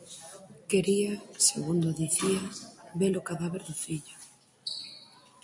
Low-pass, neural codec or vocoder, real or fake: 10.8 kHz; none; real